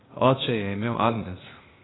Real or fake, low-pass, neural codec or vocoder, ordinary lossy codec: fake; 7.2 kHz; codec, 16 kHz, 0.8 kbps, ZipCodec; AAC, 16 kbps